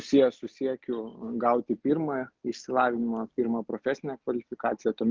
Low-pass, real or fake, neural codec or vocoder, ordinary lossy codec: 7.2 kHz; real; none; Opus, 32 kbps